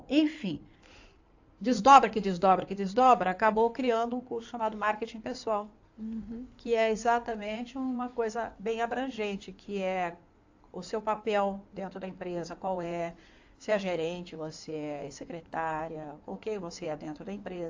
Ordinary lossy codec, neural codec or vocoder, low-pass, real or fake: AAC, 48 kbps; codec, 16 kHz in and 24 kHz out, 2.2 kbps, FireRedTTS-2 codec; 7.2 kHz; fake